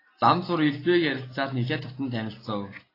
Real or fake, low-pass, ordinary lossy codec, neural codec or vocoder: real; 5.4 kHz; AAC, 24 kbps; none